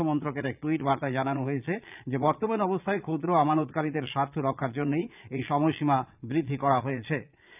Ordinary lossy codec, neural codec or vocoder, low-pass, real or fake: none; vocoder, 22.05 kHz, 80 mel bands, Vocos; 3.6 kHz; fake